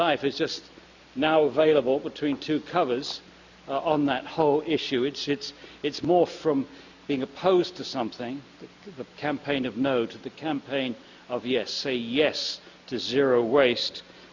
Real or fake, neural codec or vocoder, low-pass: real; none; 7.2 kHz